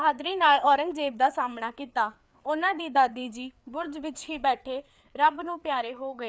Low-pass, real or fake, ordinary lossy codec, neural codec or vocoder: none; fake; none; codec, 16 kHz, 4 kbps, FreqCodec, larger model